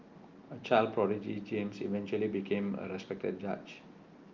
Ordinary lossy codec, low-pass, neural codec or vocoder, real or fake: Opus, 24 kbps; 7.2 kHz; none; real